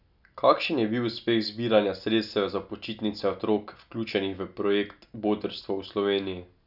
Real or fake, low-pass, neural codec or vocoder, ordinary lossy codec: real; 5.4 kHz; none; none